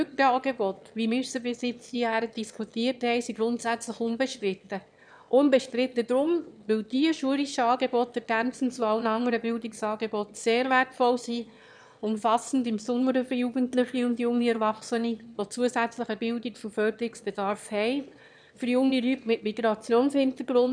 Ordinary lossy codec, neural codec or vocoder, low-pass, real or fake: none; autoencoder, 22.05 kHz, a latent of 192 numbers a frame, VITS, trained on one speaker; 9.9 kHz; fake